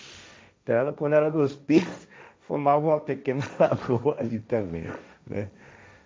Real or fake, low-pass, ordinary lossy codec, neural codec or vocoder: fake; none; none; codec, 16 kHz, 1.1 kbps, Voila-Tokenizer